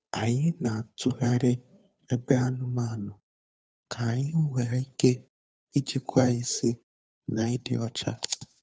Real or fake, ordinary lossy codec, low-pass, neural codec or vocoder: fake; none; none; codec, 16 kHz, 2 kbps, FunCodec, trained on Chinese and English, 25 frames a second